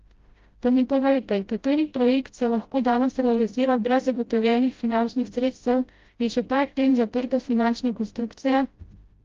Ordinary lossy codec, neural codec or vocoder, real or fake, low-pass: Opus, 32 kbps; codec, 16 kHz, 0.5 kbps, FreqCodec, smaller model; fake; 7.2 kHz